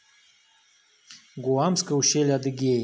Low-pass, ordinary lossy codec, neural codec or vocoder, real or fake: none; none; none; real